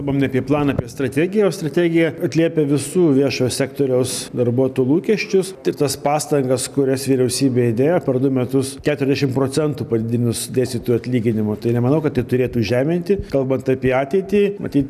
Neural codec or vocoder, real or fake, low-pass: none; real; 14.4 kHz